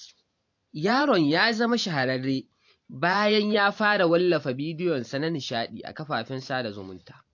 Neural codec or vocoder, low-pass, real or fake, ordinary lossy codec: vocoder, 44.1 kHz, 128 mel bands every 512 samples, BigVGAN v2; 7.2 kHz; fake; AAC, 48 kbps